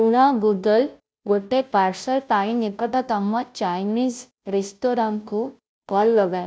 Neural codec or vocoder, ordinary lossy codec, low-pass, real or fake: codec, 16 kHz, 0.5 kbps, FunCodec, trained on Chinese and English, 25 frames a second; none; none; fake